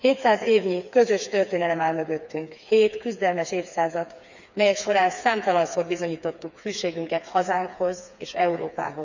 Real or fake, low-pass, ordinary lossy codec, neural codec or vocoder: fake; 7.2 kHz; none; codec, 16 kHz, 4 kbps, FreqCodec, smaller model